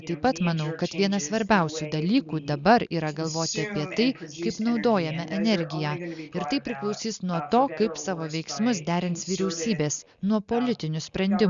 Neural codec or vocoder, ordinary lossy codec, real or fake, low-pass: none; Opus, 64 kbps; real; 7.2 kHz